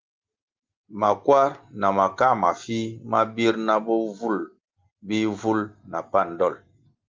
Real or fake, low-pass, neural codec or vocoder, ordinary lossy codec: real; 7.2 kHz; none; Opus, 32 kbps